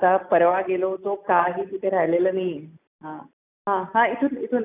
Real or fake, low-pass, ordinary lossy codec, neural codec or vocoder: real; 3.6 kHz; none; none